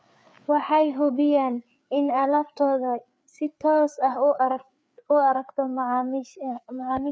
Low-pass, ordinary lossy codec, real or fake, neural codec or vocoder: none; none; fake; codec, 16 kHz, 4 kbps, FreqCodec, larger model